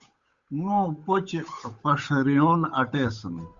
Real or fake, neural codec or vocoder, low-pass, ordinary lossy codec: fake; codec, 16 kHz, 8 kbps, FunCodec, trained on Chinese and English, 25 frames a second; 7.2 kHz; Opus, 64 kbps